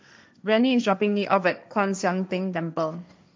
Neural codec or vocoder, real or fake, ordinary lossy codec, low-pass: codec, 16 kHz, 1.1 kbps, Voila-Tokenizer; fake; none; none